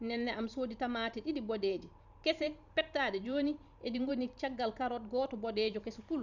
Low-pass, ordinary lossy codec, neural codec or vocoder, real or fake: 7.2 kHz; none; vocoder, 44.1 kHz, 128 mel bands every 512 samples, BigVGAN v2; fake